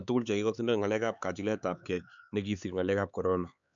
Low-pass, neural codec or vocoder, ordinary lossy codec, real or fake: 7.2 kHz; codec, 16 kHz, 4 kbps, X-Codec, HuBERT features, trained on LibriSpeech; MP3, 96 kbps; fake